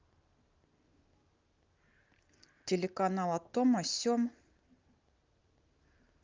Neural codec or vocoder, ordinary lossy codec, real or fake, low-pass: none; Opus, 24 kbps; real; 7.2 kHz